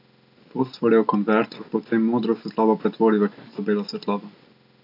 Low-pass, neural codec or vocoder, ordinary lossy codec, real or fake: 5.4 kHz; none; none; real